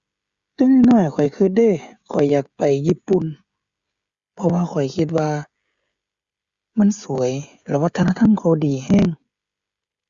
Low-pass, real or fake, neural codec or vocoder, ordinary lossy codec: 7.2 kHz; fake; codec, 16 kHz, 16 kbps, FreqCodec, smaller model; Opus, 64 kbps